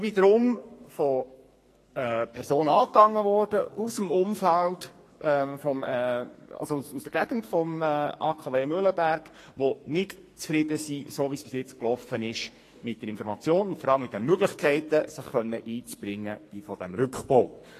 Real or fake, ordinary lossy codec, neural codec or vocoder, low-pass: fake; AAC, 48 kbps; codec, 44.1 kHz, 2.6 kbps, SNAC; 14.4 kHz